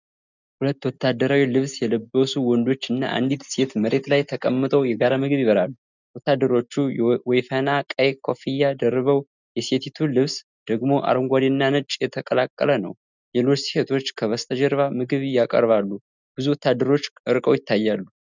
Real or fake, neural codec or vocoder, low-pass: real; none; 7.2 kHz